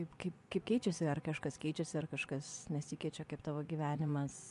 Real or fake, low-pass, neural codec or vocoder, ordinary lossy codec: fake; 10.8 kHz; vocoder, 24 kHz, 100 mel bands, Vocos; MP3, 64 kbps